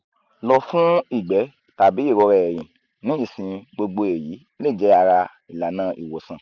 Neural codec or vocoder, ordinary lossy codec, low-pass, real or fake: none; none; 7.2 kHz; real